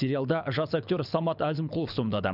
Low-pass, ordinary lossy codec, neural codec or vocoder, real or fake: 5.4 kHz; none; codec, 24 kHz, 6 kbps, HILCodec; fake